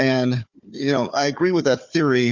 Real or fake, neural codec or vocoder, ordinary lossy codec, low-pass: fake; codec, 16 kHz, 4 kbps, FunCodec, trained on Chinese and English, 50 frames a second; Opus, 64 kbps; 7.2 kHz